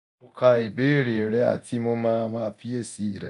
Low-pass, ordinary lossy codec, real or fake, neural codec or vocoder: 10.8 kHz; none; fake; codec, 24 kHz, 0.9 kbps, DualCodec